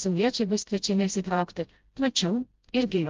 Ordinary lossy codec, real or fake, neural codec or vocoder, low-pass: Opus, 32 kbps; fake; codec, 16 kHz, 0.5 kbps, FreqCodec, smaller model; 7.2 kHz